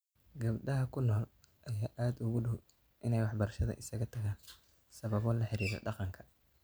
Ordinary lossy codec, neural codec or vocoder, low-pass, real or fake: none; none; none; real